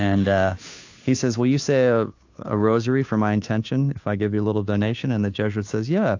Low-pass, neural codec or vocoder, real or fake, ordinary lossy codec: 7.2 kHz; codec, 16 kHz, 2 kbps, FunCodec, trained on Chinese and English, 25 frames a second; fake; AAC, 48 kbps